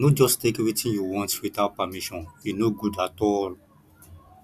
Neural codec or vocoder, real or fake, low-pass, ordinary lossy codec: none; real; 14.4 kHz; none